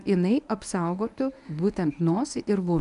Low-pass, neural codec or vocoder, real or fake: 10.8 kHz; codec, 24 kHz, 0.9 kbps, WavTokenizer, medium speech release version 1; fake